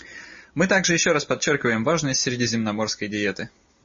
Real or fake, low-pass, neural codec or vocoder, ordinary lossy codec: real; 7.2 kHz; none; MP3, 32 kbps